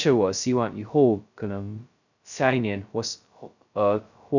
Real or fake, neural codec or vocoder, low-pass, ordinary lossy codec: fake; codec, 16 kHz, 0.2 kbps, FocalCodec; 7.2 kHz; none